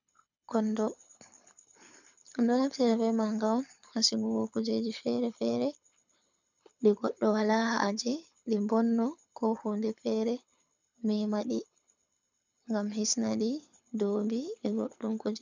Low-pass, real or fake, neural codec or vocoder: 7.2 kHz; fake; codec, 24 kHz, 6 kbps, HILCodec